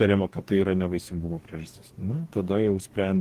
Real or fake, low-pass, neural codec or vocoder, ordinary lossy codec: fake; 14.4 kHz; codec, 44.1 kHz, 2.6 kbps, DAC; Opus, 16 kbps